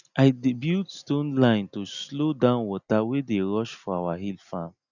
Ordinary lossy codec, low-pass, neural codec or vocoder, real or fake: none; 7.2 kHz; none; real